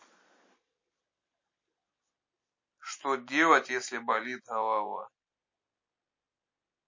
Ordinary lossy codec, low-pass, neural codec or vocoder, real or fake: MP3, 32 kbps; 7.2 kHz; none; real